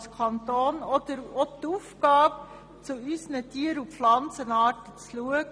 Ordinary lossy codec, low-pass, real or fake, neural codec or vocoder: none; none; real; none